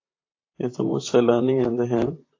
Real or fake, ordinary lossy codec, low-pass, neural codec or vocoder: fake; AAC, 32 kbps; 7.2 kHz; vocoder, 44.1 kHz, 128 mel bands, Pupu-Vocoder